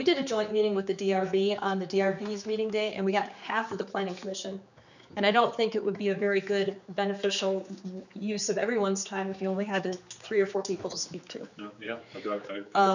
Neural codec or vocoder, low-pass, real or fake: codec, 16 kHz, 4 kbps, X-Codec, HuBERT features, trained on general audio; 7.2 kHz; fake